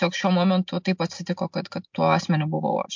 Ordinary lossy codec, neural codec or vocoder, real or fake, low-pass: MP3, 64 kbps; none; real; 7.2 kHz